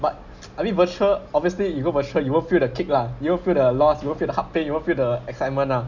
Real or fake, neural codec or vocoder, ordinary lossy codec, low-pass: real; none; none; 7.2 kHz